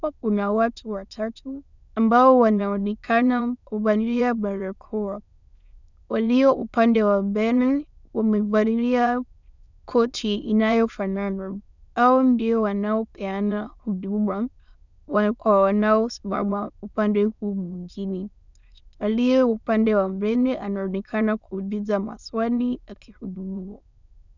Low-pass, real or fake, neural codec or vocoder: 7.2 kHz; fake; autoencoder, 22.05 kHz, a latent of 192 numbers a frame, VITS, trained on many speakers